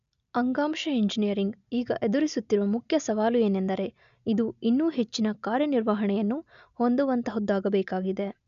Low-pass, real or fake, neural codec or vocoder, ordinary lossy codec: 7.2 kHz; real; none; none